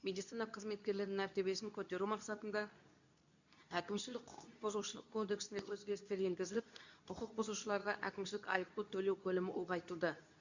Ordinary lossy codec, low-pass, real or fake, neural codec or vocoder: none; 7.2 kHz; fake; codec, 24 kHz, 0.9 kbps, WavTokenizer, medium speech release version 2